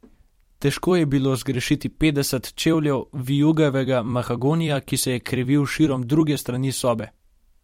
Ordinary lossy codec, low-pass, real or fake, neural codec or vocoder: MP3, 64 kbps; 19.8 kHz; fake; vocoder, 44.1 kHz, 128 mel bands every 256 samples, BigVGAN v2